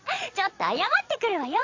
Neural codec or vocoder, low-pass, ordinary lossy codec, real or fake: vocoder, 22.05 kHz, 80 mel bands, Vocos; 7.2 kHz; AAC, 48 kbps; fake